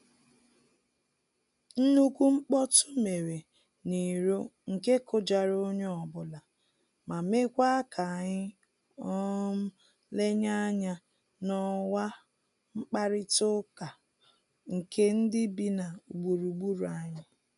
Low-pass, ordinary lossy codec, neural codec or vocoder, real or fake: 10.8 kHz; none; none; real